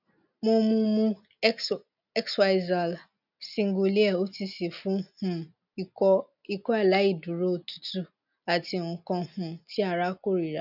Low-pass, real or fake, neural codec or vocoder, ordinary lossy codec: 5.4 kHz; real; none; none